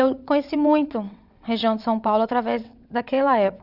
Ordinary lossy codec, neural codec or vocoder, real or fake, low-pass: none; vocoder, 22.05 kHz, 80 mel bands, WaveNeXt; fake; 5.4 kHz